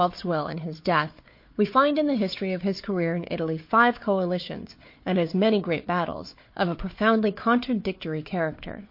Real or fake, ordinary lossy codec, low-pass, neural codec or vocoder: fake; MP3, 32 kbps; 5.4 kHz; codec, 16 kHz, 4 kbps, FunCodec, trained on Chinese and English, 50 frames a second